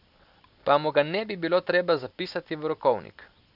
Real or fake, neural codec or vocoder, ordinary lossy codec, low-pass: real; none; none; 5.4 kHz